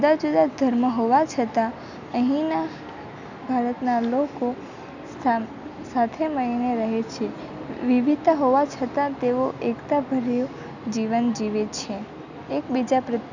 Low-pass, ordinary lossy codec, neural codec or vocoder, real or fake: 7.2 kHz; none; none; real